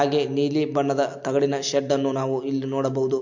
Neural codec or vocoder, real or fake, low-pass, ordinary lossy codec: none; real; 7.2 kHz; MP3, 48 kbps